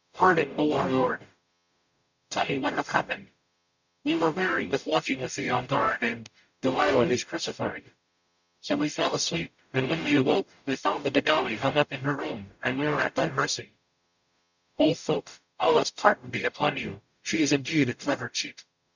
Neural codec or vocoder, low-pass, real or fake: codec, 44.1 kHz, 0.9 kbps, DAC; 7.2 kHz; fake